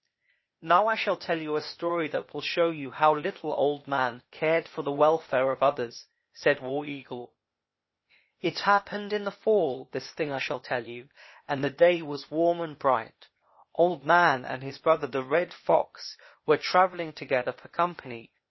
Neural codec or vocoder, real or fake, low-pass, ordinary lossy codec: codec, 16 kHz, 0.8 kbps, ZipCodec; fake; 7.2 kHz; MP3, 24 kbps